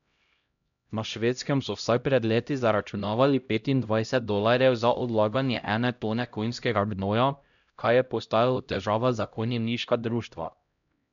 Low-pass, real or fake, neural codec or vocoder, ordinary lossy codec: 7.2 kHz; fake; codec, 16 kHz, 0.5 kbps, X-Codec, HuBERT features, trained on LibriSpeech; none